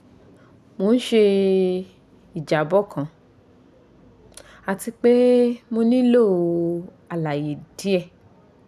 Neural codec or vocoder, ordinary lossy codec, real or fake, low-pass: none; none; real; 14.4 kHz